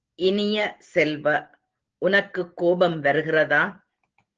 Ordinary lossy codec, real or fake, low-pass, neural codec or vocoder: Opus, 16 kbps; real; 7.2 kHz; none